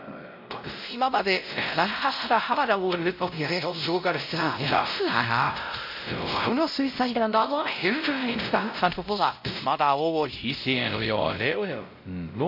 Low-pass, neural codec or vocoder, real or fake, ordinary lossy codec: 5.4 kHz; codec, 16 kHz, 0.5 kbps, X-Codec, WavLM features, trained on Multilingual LibriSpeech; fake; MP3, 48 kbps